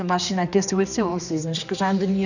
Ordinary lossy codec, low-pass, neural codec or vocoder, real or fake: none; 7.2 kHz; codec, 16 kHz, 2 kbps, X-Codec, HuBERT features, trained on general audio; fake